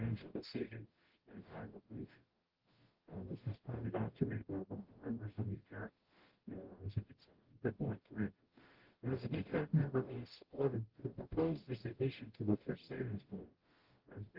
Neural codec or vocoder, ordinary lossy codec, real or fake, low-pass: codec, 44.1 kHz, 0.9 kbps, DAC; Opus, 16 kbps; fake; 5.4 kHz